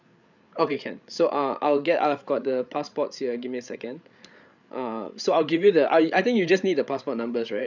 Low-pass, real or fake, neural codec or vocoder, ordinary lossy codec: 7.2 kHz; fake; codec, 16 kHz, 8 kbps, FreqCodec, larger model; none